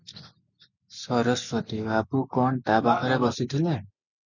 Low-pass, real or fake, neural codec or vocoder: 7.2 kHz; real; none